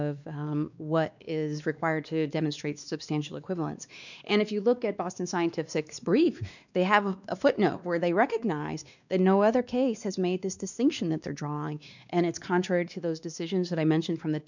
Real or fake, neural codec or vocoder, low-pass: fake; codec, 16 kHz, 2 kbps, X-Codec, WavLM features, trained on Multilingual LibriSpeech; 7.2 kHz